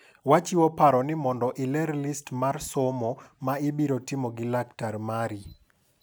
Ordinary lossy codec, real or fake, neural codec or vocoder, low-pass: none; real; none; none